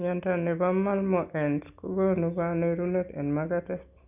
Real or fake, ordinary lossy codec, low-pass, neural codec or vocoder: real; none; 3.6 kHz; none